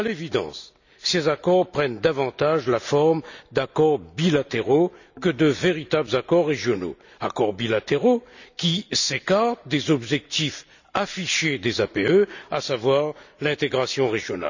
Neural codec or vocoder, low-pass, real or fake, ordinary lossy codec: none; 7.2 kHz; real; none